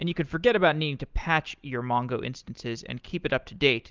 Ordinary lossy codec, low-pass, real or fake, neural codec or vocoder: Opus, 24 kbps; 7.2 kHz; real; none